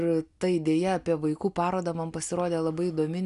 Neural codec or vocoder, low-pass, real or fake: none; 10.8 kHz; real